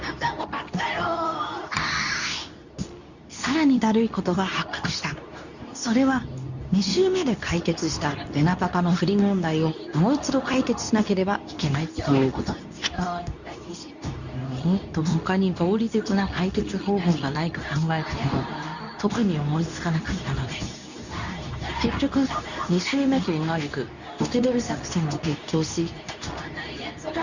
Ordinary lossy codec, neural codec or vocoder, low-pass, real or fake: none; codec, 24 kHz, 0.9 kbps, WavTokenizer, medium speech release version 2; 7.2 kHz; fake